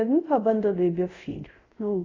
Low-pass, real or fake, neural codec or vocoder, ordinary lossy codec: 7.2 kHz; fake; codec, 24 kHz, 0.5 kbps, DualCodec; AAC, 32 kbps